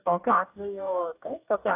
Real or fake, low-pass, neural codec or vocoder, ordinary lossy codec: fake; 3.6 kHz; codec, 44.1 kHz, 2.6 kbps, DAC; AAC, 32 kbps